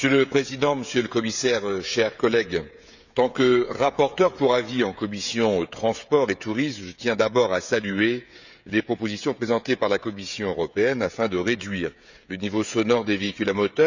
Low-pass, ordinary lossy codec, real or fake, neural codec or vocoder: 7.2 kHz; none; fake; codec, 16 kHz, 16 kbps, FreqCodec, smaller model